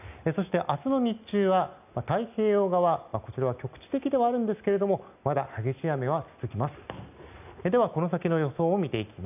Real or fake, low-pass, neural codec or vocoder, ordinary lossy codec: real; 3.6 kHz; none; none